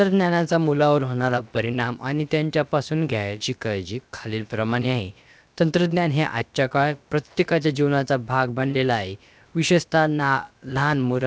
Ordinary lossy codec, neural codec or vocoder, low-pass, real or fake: none; codec, 16 kHz, about 1 kbps, DyCAST, with the encoder's durations; none; fake